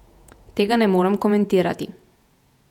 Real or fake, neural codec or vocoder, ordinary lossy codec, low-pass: fake; vocoder, 48 kHz, 128 mel bands, Vocos; none; 19.8 kHz